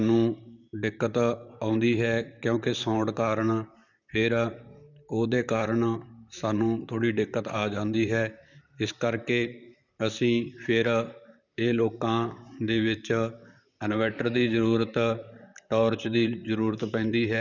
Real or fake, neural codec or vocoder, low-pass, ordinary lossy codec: fake; vocoder, 44.1 kHz, 128 mel bands, Pupu-Vocoder; 7.2 kHz; none